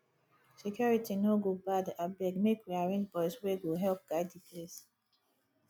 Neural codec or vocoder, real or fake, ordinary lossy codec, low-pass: none; real; none; none